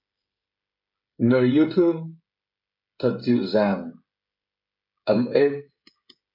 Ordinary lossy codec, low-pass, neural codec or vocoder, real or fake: MP3, 48 kbps; 5.4 kHz; codec, 16 kHz, 16 kbps, FreqCodec, smaller model; fake